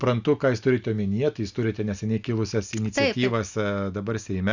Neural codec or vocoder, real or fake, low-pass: none; real; 7.2 kHz